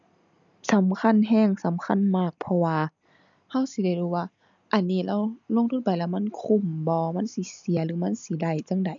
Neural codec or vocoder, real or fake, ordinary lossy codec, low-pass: none; real; none; 7.2 kHz